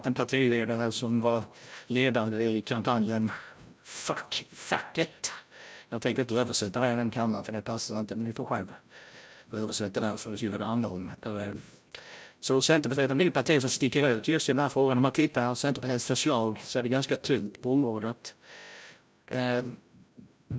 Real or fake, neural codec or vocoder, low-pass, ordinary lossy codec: fake; codec, 16 kHz, 0.5 kbps, FreqCodec, larger model; none; none